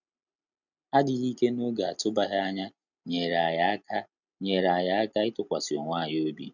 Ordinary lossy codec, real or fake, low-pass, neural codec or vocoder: none; real; 7.2 kHz; none